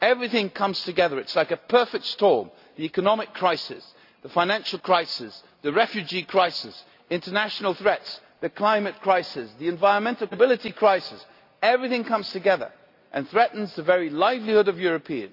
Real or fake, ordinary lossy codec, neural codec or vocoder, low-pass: real; none; none; 5.4 kHz